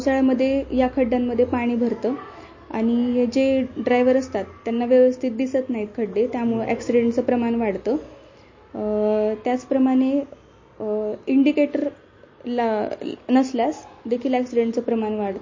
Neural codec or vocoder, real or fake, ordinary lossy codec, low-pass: none; real; MP3, 32 kbps; 7.2 kHz